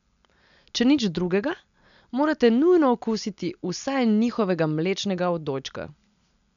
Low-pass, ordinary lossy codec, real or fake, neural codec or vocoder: 7.2 kHz; none; real; none